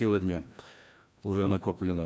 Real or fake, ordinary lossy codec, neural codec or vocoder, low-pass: fake; none; codec, 16 kHz, 1 kbps, FreqCodec, larger model; none